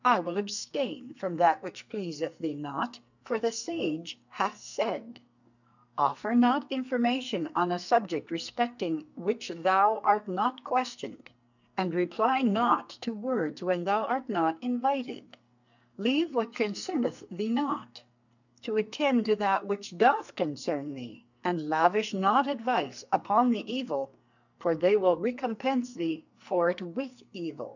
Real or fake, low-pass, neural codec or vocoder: fake; 7.2 kHz; codec, 44.1 kHz, 2.6 kbps, SNAC